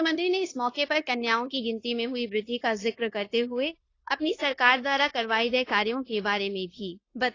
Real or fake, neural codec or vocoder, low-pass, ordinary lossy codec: fake; codec, 16 kHz, 0.9 kbps, LongCat-Audio-Codec; 7.2 kHz; AAC, 32 kbps